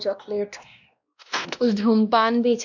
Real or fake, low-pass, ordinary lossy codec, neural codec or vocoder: fake; 7.2 kHz; none; codec, 16 kHz, 1 kbps, X-Codec, WavLM features, trained on Multilingual LibriSpeech